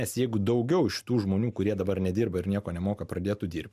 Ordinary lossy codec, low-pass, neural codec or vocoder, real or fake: MP3, 96 kbps; 14.4 kHz; none; real